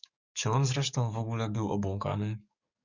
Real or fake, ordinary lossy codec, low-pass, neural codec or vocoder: fake; Opus, 64 kbps; 7.2 kHz; codec, 44.1 kHz, 7.8 kbps, DAC